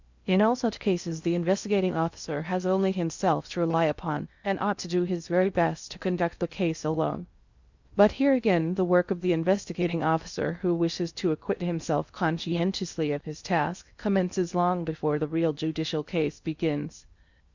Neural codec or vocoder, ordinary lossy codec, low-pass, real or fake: codec, 16 kHz in and 24 kHz out, 0.6 kbps, FocalCodec, streaming, 4096 codes; Opus, 64 kbps; 7.2 kHz; fake